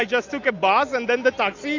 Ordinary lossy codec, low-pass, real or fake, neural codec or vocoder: AAC, 48 kbps; 7.2 kHz; real; none